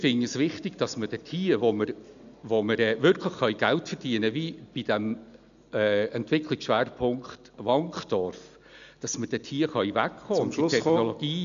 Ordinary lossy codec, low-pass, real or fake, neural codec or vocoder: none; 7.2 kHz; real; none